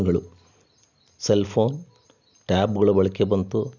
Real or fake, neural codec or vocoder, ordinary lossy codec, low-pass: real; none; none; 7.2 kHz